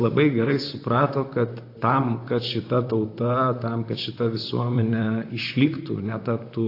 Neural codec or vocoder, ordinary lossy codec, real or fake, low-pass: vocoder, 44.1 kHz, 128 mel bands, Pupu-Vocoder; AAC, 32 kbps; fake; 5.4 kHz